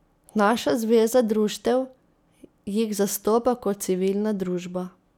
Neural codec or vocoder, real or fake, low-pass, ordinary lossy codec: none; real; 19.8 kHz; none